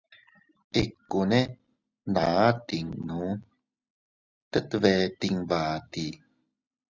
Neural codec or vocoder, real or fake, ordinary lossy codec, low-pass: none; real; Opus, 64 kbps; 7.2 kHz